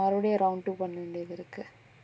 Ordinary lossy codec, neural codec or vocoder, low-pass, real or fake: none; none; none; real